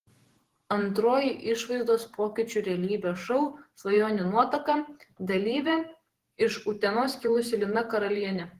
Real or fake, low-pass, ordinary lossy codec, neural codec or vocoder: fake; 14.4 kHz; Opus, 16 kbps; vocoder, 48 kHz, 128 mel bands, Vocos